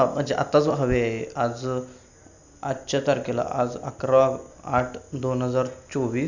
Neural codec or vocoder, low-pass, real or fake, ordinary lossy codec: none; 7.2 kHz; real; none